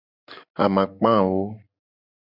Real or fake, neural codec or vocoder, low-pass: real; none; 5.4 kHz